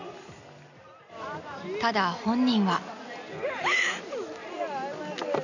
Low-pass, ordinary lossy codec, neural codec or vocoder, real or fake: 7.2 kHz; none; none; real